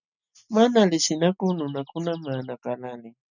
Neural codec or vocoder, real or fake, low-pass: none; real; 7.2 kHz